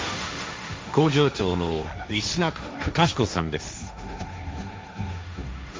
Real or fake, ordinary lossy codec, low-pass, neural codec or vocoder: fake; none; none; codec, 16 kHz, 1.1 kbps, Voila-Tokenizer